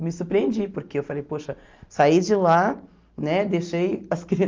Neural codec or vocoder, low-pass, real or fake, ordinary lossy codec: none; 7.2 kHz; real; Opus, 32 kbps